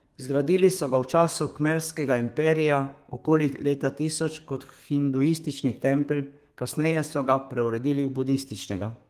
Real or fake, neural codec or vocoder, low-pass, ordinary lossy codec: fake; codec, 32 kHz, 1.9 kbps, SNAC; 14.4 kHz; Opus, 24 kbps